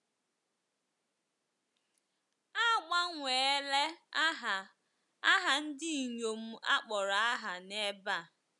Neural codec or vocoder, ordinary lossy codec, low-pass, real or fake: none; none; 10.8 kHz; real